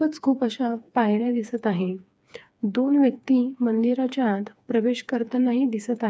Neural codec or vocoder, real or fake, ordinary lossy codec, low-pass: codec, 16 kHz, 4 kbps, FreqCodec, smaller model; fake; none; none